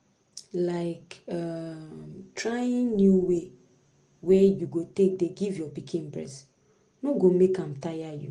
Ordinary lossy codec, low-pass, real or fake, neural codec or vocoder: Opus, 24 kbps; 9.9 kHz; real; none